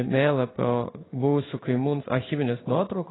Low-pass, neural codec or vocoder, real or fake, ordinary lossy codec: 7.2 kHz; codec, 16 kHz in and 24 kHz out, 1 kbps, XY-Tokenizer; fake; AAC, 16 kbps